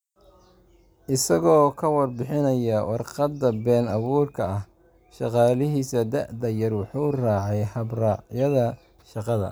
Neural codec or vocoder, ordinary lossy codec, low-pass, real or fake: none; none; none; real